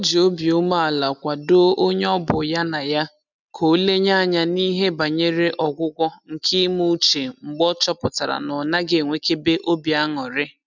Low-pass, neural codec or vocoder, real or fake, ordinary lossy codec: 7.2 kHz; none; real; none